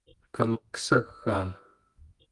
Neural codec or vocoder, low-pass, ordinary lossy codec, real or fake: codec, 24 kHz, 0.9 kbps, WavTokenizer, medium music audio release; 10.8 kHz; Opus, 24 kbps; fake